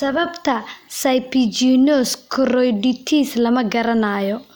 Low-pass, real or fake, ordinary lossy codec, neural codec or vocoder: none; real; none; none